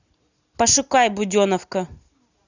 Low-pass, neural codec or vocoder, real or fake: 7.2 kHz; none; real